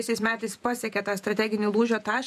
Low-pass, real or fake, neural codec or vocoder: 14.4 kHz; fake; vocoder, 48 kHz, 128 mel bands, Vocos